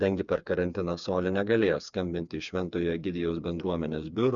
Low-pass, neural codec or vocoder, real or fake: 7.2 kHz; codec, 16 kHz, 4 kbps, FreqCodec, smaller model; fake